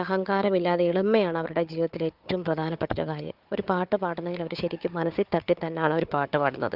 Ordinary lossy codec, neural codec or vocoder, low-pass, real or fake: Opus, 24 kbps; codec, 16 kHz, 4 kbps, FunCodec, trained on Chinese and English, 50 frames a second; 5.4 kHz; fake